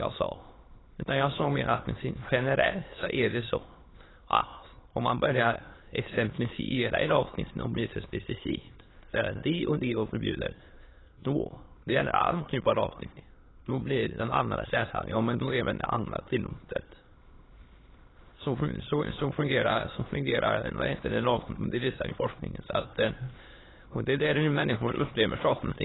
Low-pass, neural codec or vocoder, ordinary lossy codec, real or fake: 7.2 kHz; autoencoder, 22.05 kHz, a latent of 192 numbers a frame, VITS, trained on many speakers; AAC, 16 kbps; fake